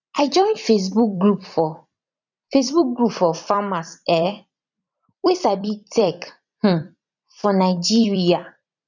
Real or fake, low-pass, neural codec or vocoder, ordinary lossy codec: fake; 7.2 kHz; vocoder, 44.1 kHz, 128 mel bands every 256 samples, BigVGAN v2; none